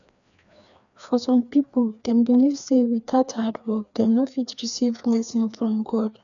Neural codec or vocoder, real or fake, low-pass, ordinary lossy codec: codec, 16 kHz, 2 kbps, FreqCodec, larger model; fake; 7.2 kHz; none